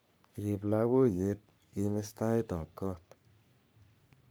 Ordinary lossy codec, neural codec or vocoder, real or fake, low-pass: none; codec, 44.1 kHz, 3.4 kbps, Pupu-Codec; fake; none